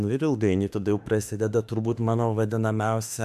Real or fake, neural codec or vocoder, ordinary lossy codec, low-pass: fake; autoencoder, 48 kHz, 32 numbers a frame, DAC-VAE, trained on Japanese speech; AAC, 96 kbps; 14.4 kHz